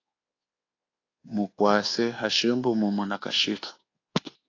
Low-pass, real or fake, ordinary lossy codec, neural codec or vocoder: 7.2 kHz; fake; AAC, 32 kbps; codec, 24 kHz, 1.2 kbps, DualCodec